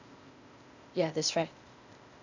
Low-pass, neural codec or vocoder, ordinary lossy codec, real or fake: 7.2 kHz; codec, 16 kHz, 0.8 kbps, ZipCodec; none; fake